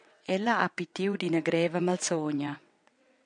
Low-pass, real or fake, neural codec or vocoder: 9.9 kHz; fake; vocoder, 22.05 kHz, 80 mel bands, WaveNeXt